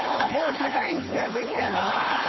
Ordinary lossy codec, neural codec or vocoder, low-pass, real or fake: MP3, 24 kbps; codec, 16 kHz, 4.8 kbps, FACodec; 7.2 kHz; fake